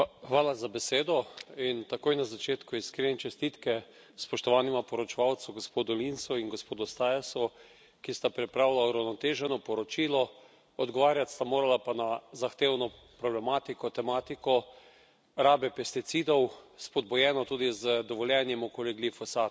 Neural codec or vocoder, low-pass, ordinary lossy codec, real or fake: none; none; none; real